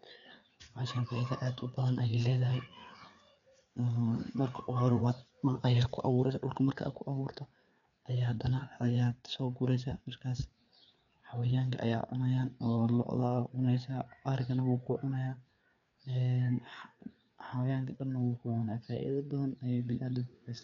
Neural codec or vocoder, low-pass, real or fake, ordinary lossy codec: codec, 16 kHz, 4 kbps, FreqCodec, larger model; 7.2 kHz; fake; none